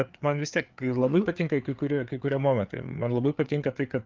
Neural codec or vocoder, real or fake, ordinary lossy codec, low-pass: codec, 16 kHz, 4 kbps, FreqCodec, larger model; fake; Opus, 32 kbps; 7.2 kHz